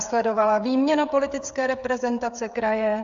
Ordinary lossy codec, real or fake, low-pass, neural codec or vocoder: AAC, 64 kbps; fake; 7.2 kHz; codec, 16 kHz, 16 kbps, FreqCodec, smaller model